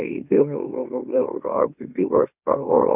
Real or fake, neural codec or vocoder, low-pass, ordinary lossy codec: fake; autoencoder, 44.1 kHz, a latent of 192 numbers a frame, MeloTTS; 3.6 kHz; none